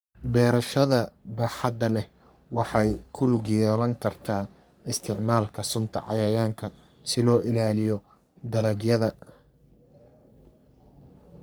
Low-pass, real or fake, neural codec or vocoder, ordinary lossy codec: none; fake; codec, 44.1 kHz, 3.4 kbps, Pupu-Codec; none